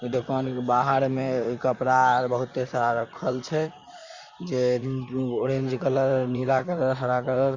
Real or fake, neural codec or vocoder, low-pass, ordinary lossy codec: fake; vocoder, 44.1 kHz, 128 mel bands, Pupu-Vocoder; 7.2 kHz; Opus, 64 kbps